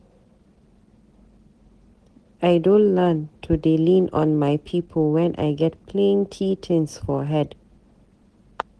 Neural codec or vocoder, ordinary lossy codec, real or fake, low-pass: none; Opus, 16 kbps; real; 10.8 kHz